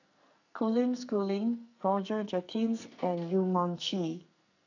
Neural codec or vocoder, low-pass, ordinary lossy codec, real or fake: codec, 44.1 kHz, 2.6 kbps, SNAC; 7.2 kHz; none; fake